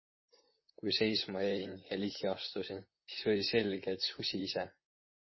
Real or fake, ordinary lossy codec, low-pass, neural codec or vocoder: fake; MP3, 24 kbps; 7.2 kHz; vocoder, 44.1 kHz, 128 mel bands, Pupu-Vocoder